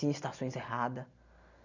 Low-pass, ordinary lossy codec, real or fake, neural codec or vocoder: 7.2 kHz; none; real; none